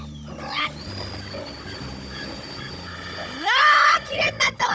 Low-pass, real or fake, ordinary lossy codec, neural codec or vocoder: none; fake; none; codec, 16 kHz, 16 kbps, FunCodec, trained on Chinese and English, 50 frames a second